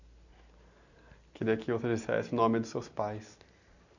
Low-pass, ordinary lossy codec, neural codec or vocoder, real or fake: 7.2 kHz; none; none; real